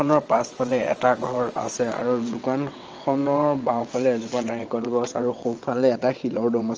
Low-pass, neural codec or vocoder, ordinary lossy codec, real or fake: 7.2 kHz; vocoder, 22.05 kHz, 80 mel bands, WaveNeXt; Opus, 24 kbps; fake